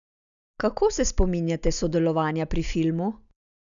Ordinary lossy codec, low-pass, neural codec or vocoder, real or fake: none; 7.2 kHz; none; real